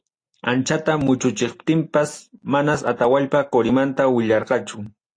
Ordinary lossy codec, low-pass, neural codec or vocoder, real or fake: AAC, 48 kbps; 9.9 kHz; none; real